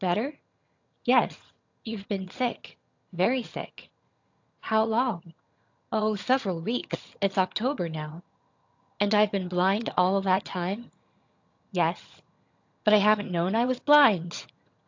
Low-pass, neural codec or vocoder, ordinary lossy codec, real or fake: 7.2 kHz; vocoder, 22.05 kHz, 80 mel bands, HiFi-GAN; AAC, 48 kbps; fake